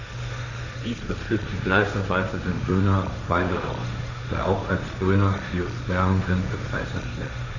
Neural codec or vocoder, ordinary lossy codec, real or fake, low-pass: codec, 16 kHz, 1.1 kbps, Voila-Tokenizer; none; fake; 7.2 kHz